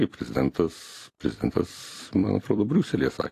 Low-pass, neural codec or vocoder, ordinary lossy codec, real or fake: 14.4 kHz; vocoder, 44.1 kHz, 128 mel bands every 256 samples, BigVGAN v2; AAC, 48 kbps; fake